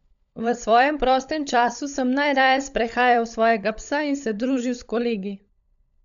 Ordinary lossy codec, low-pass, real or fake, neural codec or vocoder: none; 7.2 kHz; fake; codec, 16 kHz, 16 kbps, FunCodec, trained on LibriTTS, 50 frames a second